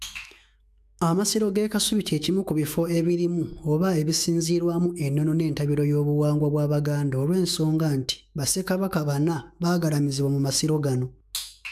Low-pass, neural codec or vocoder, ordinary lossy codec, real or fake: 14.4 kHz; autoencoder, 48 kHz, 128 numbers a frame, DAC-VAE, trained on Japanese speech; none; fake